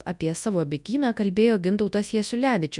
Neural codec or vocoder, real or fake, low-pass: codec, 24 kHz, 0.9 kbps, WavTokenizer, large speech release; fake; 10.8 kHz